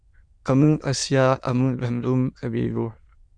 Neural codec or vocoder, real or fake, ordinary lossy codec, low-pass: autoencoder, 22.05 kHz, a latent of 192 numbers a frame, VITS, trained on many speakers; fake; Opus, 64 kbps; 9.9 kHz